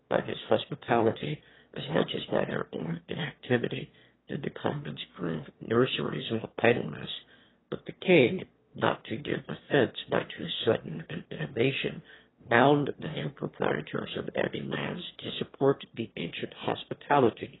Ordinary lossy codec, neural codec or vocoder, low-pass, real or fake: AAC, 16 kbps; autoencoder, 22.05 kHz, a latent of 192 numbers a frame, VITS, trained on one speaker; 7.2 kHz; fake